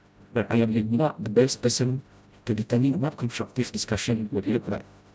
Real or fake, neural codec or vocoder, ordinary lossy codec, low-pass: fake; codec, 16 kHz, 0.5 kbps, FreqCodec, smaller model; none; none